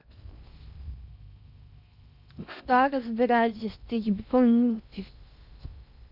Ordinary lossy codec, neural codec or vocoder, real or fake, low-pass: none; codec, 16 kHz in and 24 kHz out, 0.6 kbps, FocalCodec, streaming, 4096 codes; fake; 5.4 kHz